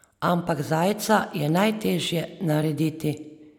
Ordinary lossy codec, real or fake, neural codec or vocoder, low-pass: none; real; none; 19.8 kHz